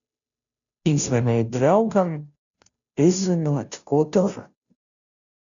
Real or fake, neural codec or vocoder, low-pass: fake; codec, 16 kHz, 0.5 kbps, FunCodec, trained on Chinese and English, 25 frames a second; 7.2 kHz